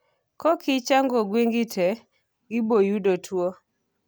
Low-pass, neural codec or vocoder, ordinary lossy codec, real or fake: none; none; none; real